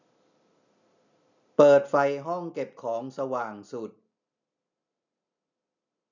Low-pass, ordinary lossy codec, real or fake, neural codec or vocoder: 7.2 kHz; none; real; none